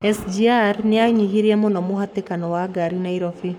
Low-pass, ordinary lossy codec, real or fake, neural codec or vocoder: 19.8 kHz; none; fake; codec, 44.1 kHz, 7.8 kbps, Pupu-Codec